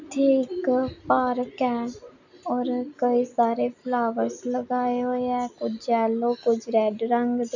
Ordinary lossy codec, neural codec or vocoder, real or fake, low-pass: none; none; real; 7.2 kHz